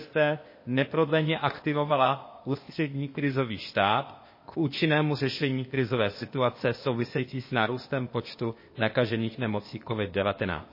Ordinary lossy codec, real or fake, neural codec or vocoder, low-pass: MP3, 24 kbps; fake; codec, 16 kHz, 0.8 kbps, ZipCodec; 5.4 kHz